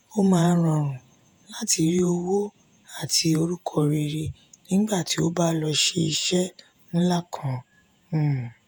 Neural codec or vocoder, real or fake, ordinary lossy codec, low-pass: vocoder, 48 kHz, 128 mel bands, Vocos; fake; none; none